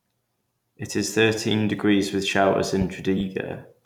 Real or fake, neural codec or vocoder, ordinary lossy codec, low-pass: fake; vocoder, 44.1 kHz, 128 mel bands every 256 samples, BigVGAN v2; none; 19.8 kHz